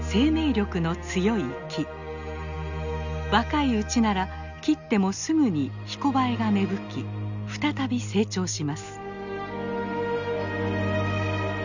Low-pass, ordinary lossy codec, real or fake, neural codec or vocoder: 7.2 kHz; none; real; none